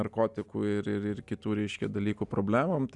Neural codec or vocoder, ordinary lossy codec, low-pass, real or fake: none; Opus, 64 kbps; 10.8 kHz; real